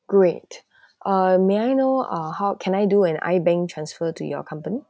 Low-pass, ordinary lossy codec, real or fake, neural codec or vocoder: none; none; real; none